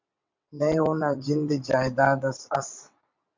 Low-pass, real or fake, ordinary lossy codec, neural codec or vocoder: 7.2 kHz; fake; MP3, 64 kbps; vocoder, 22.05 kHz, 80 mel bands, WaveNeXt